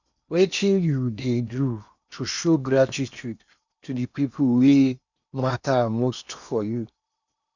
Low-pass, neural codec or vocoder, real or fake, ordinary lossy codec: 7.2 kHz; codec, 16 kHz in and 24 kHz out, 0.8 kbps, FocalCodec, streaming, 65536 codes; fake; none